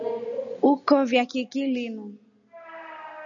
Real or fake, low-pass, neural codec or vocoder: real; 7.2 kHz; none